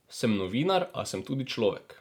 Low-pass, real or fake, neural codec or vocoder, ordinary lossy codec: none; real; none; none